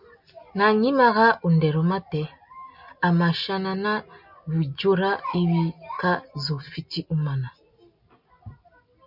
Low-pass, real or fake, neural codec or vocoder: 5.4 kHz; real; none